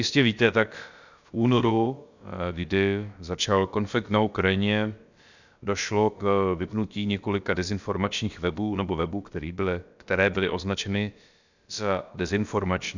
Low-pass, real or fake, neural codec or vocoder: 7.2 kHz; fake; codec, 16 kHz, about 1 kbps, DyCAST, with the encoder's durations